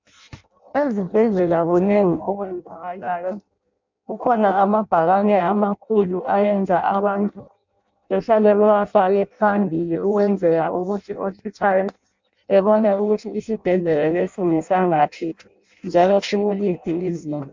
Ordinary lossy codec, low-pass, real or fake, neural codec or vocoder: MP3, 64 kbps; 7.2 kHz; fake; codec, 16 kHz in and 24 kHz out, 0.6 kbps, FireRedTTS-2 codec